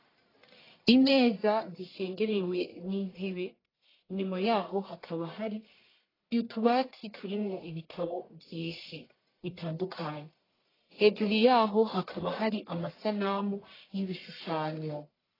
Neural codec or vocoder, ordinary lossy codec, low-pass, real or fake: codec, 44.1 kHz, 1.7 kbps, Pupu-Codec; AAC, 24 kbps; 5.4 kHz; fake